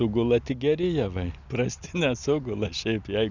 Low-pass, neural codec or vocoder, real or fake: 7.2 kHz; none; real